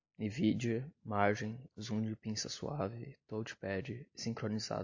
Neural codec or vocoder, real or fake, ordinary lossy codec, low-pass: none; real; AAC, 48 kbps; 7.2 kHz